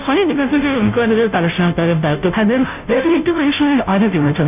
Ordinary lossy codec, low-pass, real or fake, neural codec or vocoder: none; 3.6 kHz; fake; codec, 16 kHz, 0.5 kbps, FunCodec, trained on Chinese and English, 25 frames a second